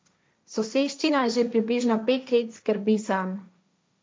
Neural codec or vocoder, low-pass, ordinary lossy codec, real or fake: codec, 16 kHz, 1.1 kbps, Voila-Tokenizer; none; none; fake